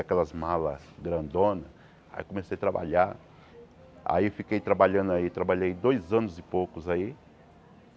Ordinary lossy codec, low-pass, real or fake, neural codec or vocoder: none; none; real; none